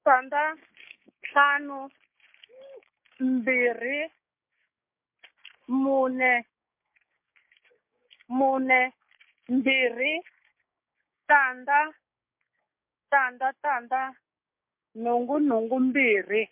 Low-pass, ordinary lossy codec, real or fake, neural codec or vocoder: 3.6 kHz; MP3, 32 kbps; fake; codec, 16 kHz, 6 kbps, DAC